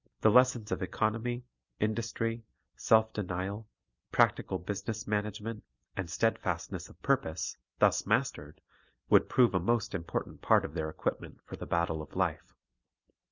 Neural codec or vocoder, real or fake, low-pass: none; real; 7.2 kHz